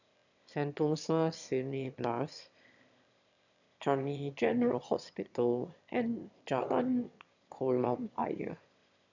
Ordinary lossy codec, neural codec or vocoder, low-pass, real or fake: none; autoencoder, 22.05 kHz, a latent of 192 numbers a frame, VITS, trained on one speaker; 7.2 kHz; fake